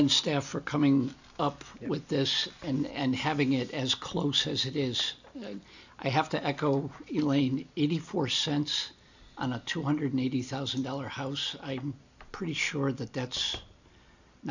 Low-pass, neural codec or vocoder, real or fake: 7.2 kHz; none; real